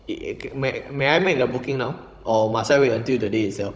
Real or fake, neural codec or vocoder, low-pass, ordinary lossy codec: fake; codec, 16 kHz, 16 kbps, FunCodec, trained on Chinese and English, 50 frames a second; none; none